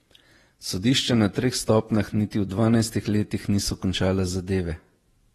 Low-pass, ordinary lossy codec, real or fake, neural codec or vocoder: 19.8 kHz; AAC, 32 kbps; fake; vocoder, 48 kHz, 128 mel bands, Vocos